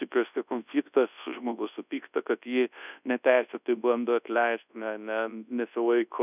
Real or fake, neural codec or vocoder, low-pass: fake; codec, 24 kHz, 0.9 kbps, WavTokenizer, large speech release; 3.6 kHz